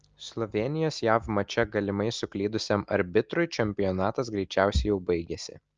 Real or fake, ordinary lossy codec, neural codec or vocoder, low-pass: real; Opus, 24 kbps; none; 7.2 kHz